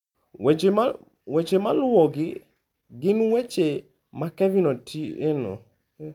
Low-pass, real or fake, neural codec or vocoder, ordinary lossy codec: 19.8 kHz; real; none; none